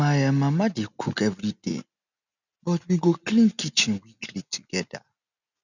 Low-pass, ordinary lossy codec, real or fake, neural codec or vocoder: 7.2 kHz; none; real; none